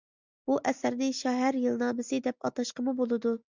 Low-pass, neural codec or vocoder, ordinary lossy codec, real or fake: 7.2 kHz; none; Opus, 64 kbps; real